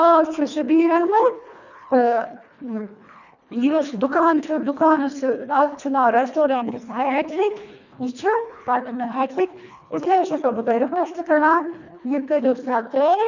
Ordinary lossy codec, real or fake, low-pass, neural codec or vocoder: none; fake; 7.2 kHz; codec, 24 kHz, 1.5 kbps, HILCodec